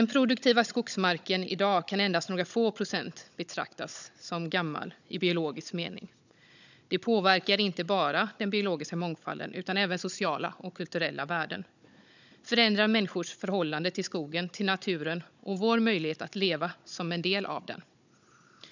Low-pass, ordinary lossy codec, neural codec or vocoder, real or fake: 7.2 kHz; none; codec, 16 kHz, 16 kbps, FunCodec, trained on Chinese and English, 50 frames a second; fake